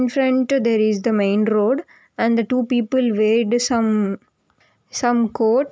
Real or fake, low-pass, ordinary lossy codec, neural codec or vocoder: real; none; none; none